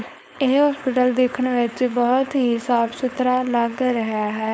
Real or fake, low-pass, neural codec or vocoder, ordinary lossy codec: fake; none; codec, 16 kHz, 4.8 kbps, FACodec; none